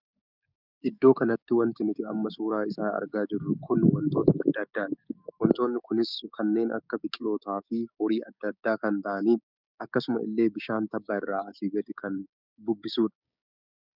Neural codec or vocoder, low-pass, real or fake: codec, 16 kHz, 6 kbps, DAC; 5.4 kHz; fake